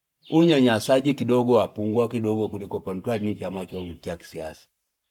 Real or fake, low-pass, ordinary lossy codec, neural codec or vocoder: fake; 19.8 kHz; none; codec, 44.1 kHz, 7.8 kbps, Pupu-Codec